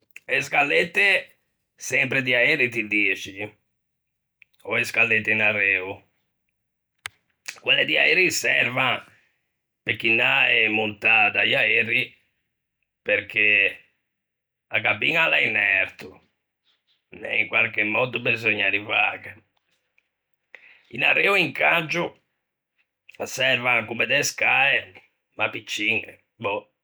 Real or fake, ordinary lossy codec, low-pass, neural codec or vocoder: real; none; none; none